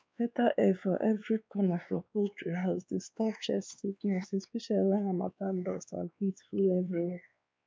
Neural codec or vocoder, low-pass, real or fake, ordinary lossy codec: codec, 16 kHz, 2 kbps, X-Codec, WavLM features, trained on Multilingual LibriSpeech; none; fake; none